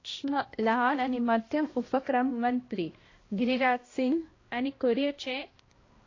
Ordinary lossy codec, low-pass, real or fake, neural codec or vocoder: AAC, 32 kbps; 7.2 kHz; fake; codec, 16 kHz, 1 kbps, X-Codec, HuBERT features, trained on balanced general audio